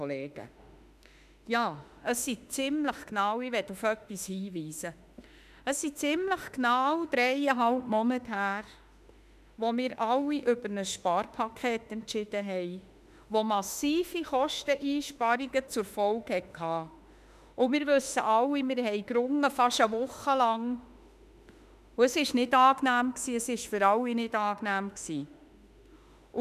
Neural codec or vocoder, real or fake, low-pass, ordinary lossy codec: autoencoder, 48 kHz, 32 numbers a frame, DAC-VAE, trained on Japanese speech; fake; 14.4 kHz; none